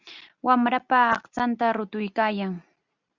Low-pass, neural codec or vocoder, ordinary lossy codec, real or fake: 7.2 kHz; none; Opus, 64 kbps; real